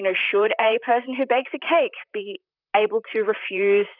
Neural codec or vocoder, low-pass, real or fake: vocoder, 44.1 kHz, 128 mel bands, Pupu-Vocoder; 5.4 kHz; fake